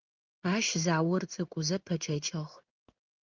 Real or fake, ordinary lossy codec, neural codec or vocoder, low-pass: fake; Opus, 32 kbps; codec, 16 kHz in and 24 kHz out, 1 kbps, XY-Tokenizer; 7.2 kHz